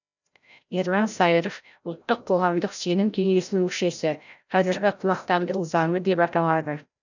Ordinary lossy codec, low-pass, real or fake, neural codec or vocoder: none; 7.2 kHz; fake; codec, 16 kHz, 0.5 kbps, FreqCodec, larger model